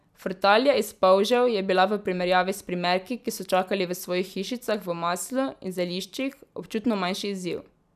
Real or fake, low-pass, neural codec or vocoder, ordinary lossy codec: fake; 14.4 kHz; vocoder, 44.1 kHz, 128 mel bands every 256 samples, BigVGAN v2; none